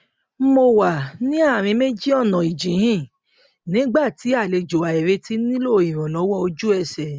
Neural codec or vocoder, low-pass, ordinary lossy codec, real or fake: none; none; none; real